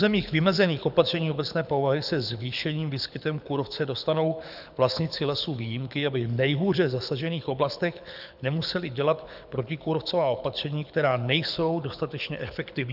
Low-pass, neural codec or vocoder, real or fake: 5.4 kHz; codec, 24 kHz, 6 kbps, HILCodec; fake